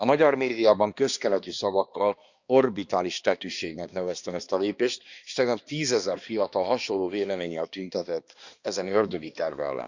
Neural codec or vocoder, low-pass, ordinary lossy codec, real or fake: codec, 16 kHz, 2 kbps, X-Codec, HuBERT features, trained on balanced general audio; 7.2 kHz; Opus, 64 kbps; fake